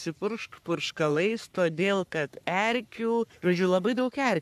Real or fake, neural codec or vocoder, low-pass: fake; codec, 44.1 kHz, 3.4 kbps, Pupu-Codec; 14.4 kHz